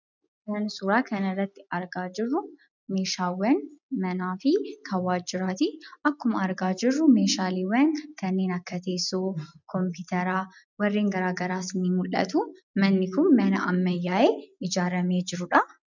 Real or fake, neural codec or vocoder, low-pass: real; none; 7.2 kHz